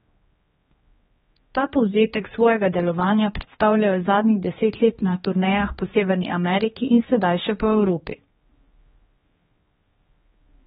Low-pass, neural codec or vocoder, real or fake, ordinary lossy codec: 7.2 kHz; codec, 16 kHz, 2 kbps, X-Codec, HuBERT features, trained on general audio; fake; AAC, 16 kbps